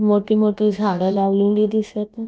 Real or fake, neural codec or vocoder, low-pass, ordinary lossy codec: fake; codec, 16 kHz, about 1 kbps, DyCAST, with the encoder's durations; none; none